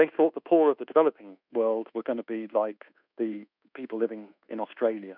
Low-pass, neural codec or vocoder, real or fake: 5.4 kHz; codec, 24 kHz, 1.2 kbps, DualCodec; fake